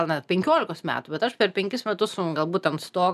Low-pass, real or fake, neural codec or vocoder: 14.4 kHz; real; none